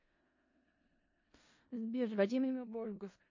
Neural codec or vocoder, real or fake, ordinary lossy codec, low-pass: codec, 16 kHz in and 24 kHz out, 0.4 kbps, LongCat-Audio-Codec, four codebook decoder; fake; MP3, 32 kbps; 7.2 kHz